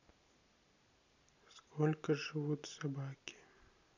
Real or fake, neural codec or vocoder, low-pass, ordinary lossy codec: real; none; 7.2 kHz; none